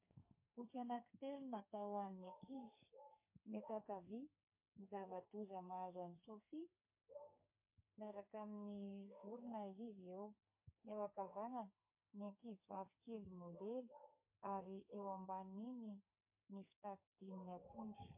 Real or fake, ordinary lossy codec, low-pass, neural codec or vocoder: fake; MP3, 24 kbps; 3.6 kHz; codec, 32 kHz, 1.9 kbps, SNAC